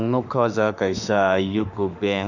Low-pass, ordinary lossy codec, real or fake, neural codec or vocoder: 7.2 kHz; none; fake; autoencoder, 48 kHz, 32 numbers a frame, DAC-VAE, trained on Japanese speech